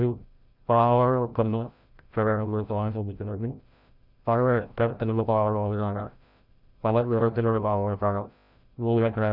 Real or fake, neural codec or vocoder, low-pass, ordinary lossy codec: fake; codec, 16 kHz, 0.5 kbps, FreqCodec, larger model; 5.4 kHz; none